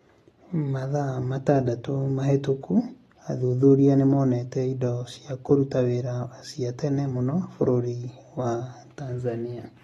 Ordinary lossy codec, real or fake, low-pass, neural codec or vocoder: AAC, 32 kbps; real; 19.8 kHz; none